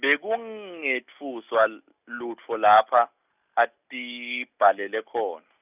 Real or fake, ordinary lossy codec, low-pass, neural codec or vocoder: real; none; 3.6 kHz; none